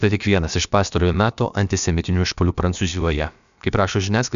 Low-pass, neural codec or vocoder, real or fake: 7.2 kHz; codec, 16 kHz, about 1 kbps, DyCAST, with the encoder's durations; fake